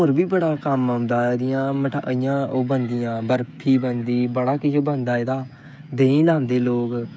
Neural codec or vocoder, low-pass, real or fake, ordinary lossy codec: codec, 16 kHz, 16 kbps, FreqCodec, smaller model; none; fake; none